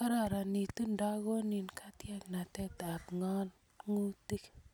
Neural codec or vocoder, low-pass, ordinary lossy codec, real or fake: none; none; none; real